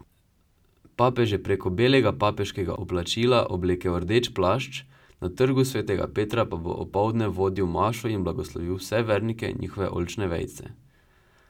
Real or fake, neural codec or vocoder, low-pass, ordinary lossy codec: real; none; 19.8 kHz; none